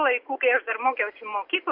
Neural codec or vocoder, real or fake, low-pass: none; real; 5.4 kHz